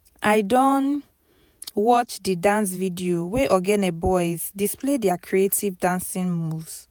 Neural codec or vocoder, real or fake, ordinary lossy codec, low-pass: vocoder, 48 kHz, 128 mel bands, Vocos; fake; none; none